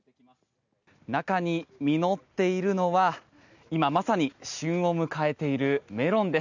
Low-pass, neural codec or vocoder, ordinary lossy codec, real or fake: 7.2 kHz; none; none; real